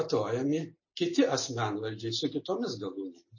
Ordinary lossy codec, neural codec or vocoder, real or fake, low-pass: MP3, 32 kbps; none; real; 7.2 kHz